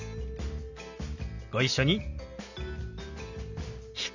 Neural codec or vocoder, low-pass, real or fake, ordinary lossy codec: none; 7.2 kHz; real; none